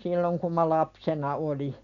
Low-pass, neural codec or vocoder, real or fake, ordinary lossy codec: 7.2 kHz; none; real; none